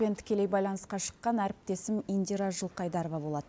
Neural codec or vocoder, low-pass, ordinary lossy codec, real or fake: none; none; none; real